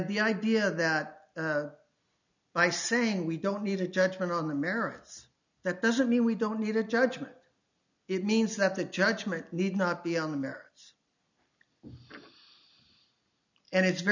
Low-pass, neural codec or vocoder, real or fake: 7.2 kHz; none; real